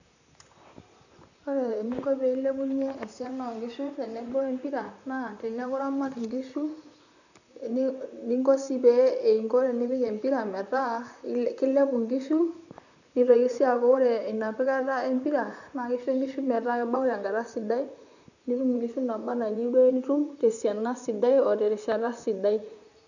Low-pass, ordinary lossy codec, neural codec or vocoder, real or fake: 7.2 kHz; none; vocoder, 44.1 kHz, 128 mel bands, Pupu-Vocoder; fake